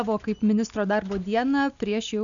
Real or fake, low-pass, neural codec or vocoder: real; 7.2 kHz; none